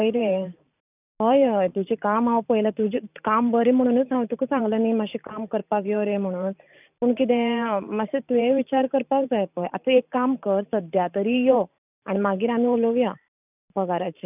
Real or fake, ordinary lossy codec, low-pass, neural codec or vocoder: fake; none; 3.6 kHz; vocoder, 44.1 kHz, 128 mel bands every 512 samples, BigVGAN v2